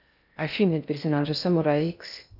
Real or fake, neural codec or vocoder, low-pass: fake; codec, 16 kHz in and 24 kHz out, 0.6 kbps, FocalCodec, streaming, 2048 codes; 5.4 kHz